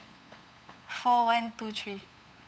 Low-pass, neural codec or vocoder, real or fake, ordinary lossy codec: none; codec, 16 kHz, 8 kbps, FunCodec, trained on LibriTTS, 25 frames a second; fake; none